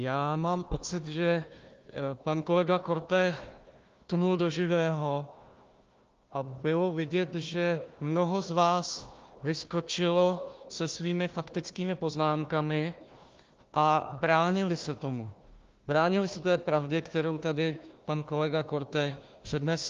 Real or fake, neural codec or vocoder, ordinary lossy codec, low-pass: fake; codec, 16 kHz, 1 kbps, FunCodec, trained on Chinese and English, 50 frames a second; Opus, 32 kbps; 7.2 kHz